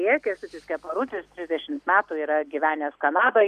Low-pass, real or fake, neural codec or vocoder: 14.4 kHz; real; none